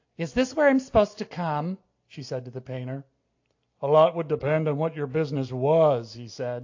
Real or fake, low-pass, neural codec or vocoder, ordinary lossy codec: real; 7.2 kHz; none; AAC, 48 kbps